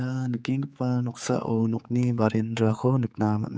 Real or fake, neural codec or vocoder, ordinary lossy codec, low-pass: fake; codec, 16 kHz, 4 kbps, X-Codec, HuBERT features, trained on general audio; none; none